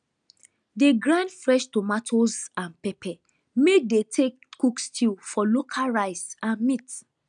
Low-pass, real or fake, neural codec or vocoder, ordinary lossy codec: 9.9 kHz; real; none; none